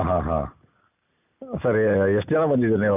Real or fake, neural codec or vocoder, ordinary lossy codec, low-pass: real; none; none; 3.6 kHz